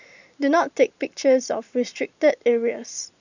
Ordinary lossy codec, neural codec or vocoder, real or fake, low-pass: none; vocoder, 44.1 kHz, 128 mel bands every 512 samples, BigVGAN v2; fake; 7.2 kHz